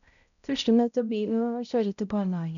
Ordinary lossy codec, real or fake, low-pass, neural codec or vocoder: none; fake; 7.2 kHz; codec, 16 kHz, 0.5 kbps, X-Codec, HuBERT features, trained on balanced general audio